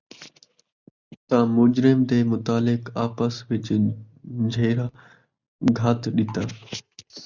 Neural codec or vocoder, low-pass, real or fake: none; 7.2 kHz; real